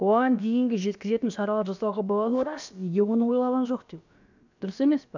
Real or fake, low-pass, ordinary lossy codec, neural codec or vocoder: fake; 7.2 kHz; none; codec, 16 kHz, about 1 kbps, DyCAST, with the encoder's durations